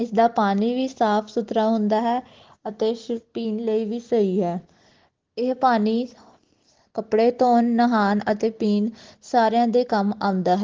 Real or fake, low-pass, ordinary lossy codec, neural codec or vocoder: real; 7.2 kHz; Opus, 16 kbps; none